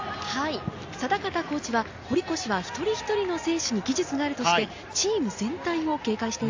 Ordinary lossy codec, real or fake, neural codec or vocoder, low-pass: AAC, 48 kbps; real; none; 7.2 kHz